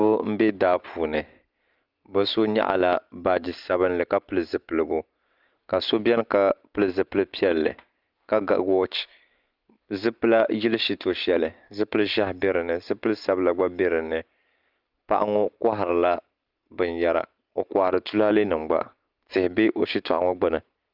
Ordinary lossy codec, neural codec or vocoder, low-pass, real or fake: Opus, 24 kbps; none; 5.4 kHz; real